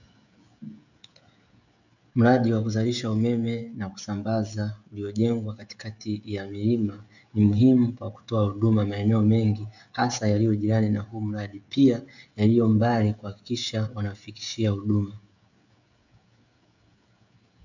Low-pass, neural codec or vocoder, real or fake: 7.2 kHz; codec, 16 kHz, 16 kbps, FreqCodec, smaller model; fake